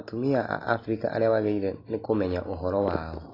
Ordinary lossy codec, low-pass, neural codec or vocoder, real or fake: AAC, 24 kbps; 5.4 kHz; none; real